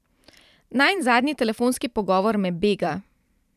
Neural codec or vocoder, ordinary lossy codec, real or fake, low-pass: vocoder, 44.1 kHz, 128 mel bands every 512 samples, BigVGAN v2; none; fake; 14.4 kHz